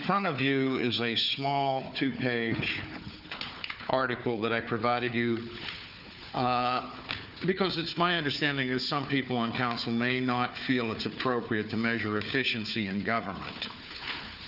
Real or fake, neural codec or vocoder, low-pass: fake; codec, 16 kHz, 4 kbps, FunCodec, trained on Chinese and English, 50 frames a second; 5.4 kHz